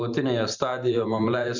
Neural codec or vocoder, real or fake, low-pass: vocoder, 44.1 kHz, 128 mel bands every 512 samples, BigVGAN v2; fake; 7.2 kHz